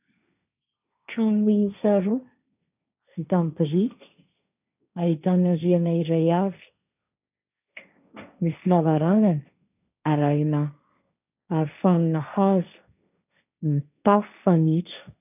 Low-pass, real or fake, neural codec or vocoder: 3.6 kHz; fake; codec, 16 kHz, 1.1 kbps, Voila-Tokenizer